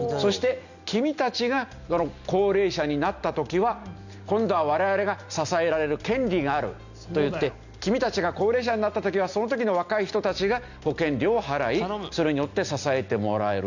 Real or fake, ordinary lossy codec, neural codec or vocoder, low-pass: real; none; none; 7.2 kHz